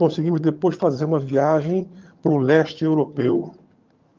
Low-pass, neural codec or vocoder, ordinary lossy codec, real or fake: 7.2 kHz; vocoder, 22.05 kHz, 80 mel bands, HiFi-GAN; Opus, 24 kbps; fake